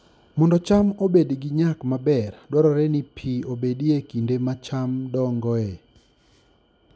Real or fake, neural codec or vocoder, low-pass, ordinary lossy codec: real; none; none; none